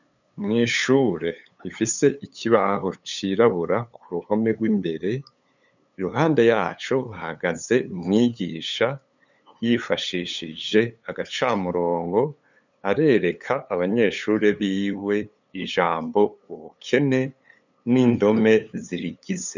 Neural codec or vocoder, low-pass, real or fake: codec, 16 kHz, 8 kbps, FunCodec, trained on LibriTTS, 25 frames a second; 7.2 kHz; fake